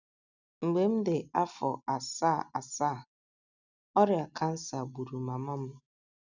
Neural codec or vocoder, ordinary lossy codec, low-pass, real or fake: none; none; 7.2 kHz; real